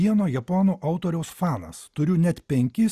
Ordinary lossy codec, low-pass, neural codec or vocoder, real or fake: Opus, 64 kbps; 14.4 kHz; none; real